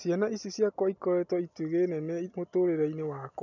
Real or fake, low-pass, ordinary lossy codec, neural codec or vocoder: real; 7.2 kHz; none; none